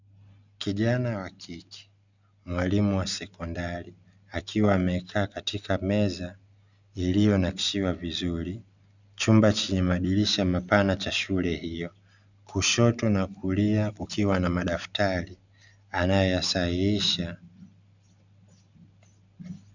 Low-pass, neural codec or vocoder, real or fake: 7.2 kHz; none; real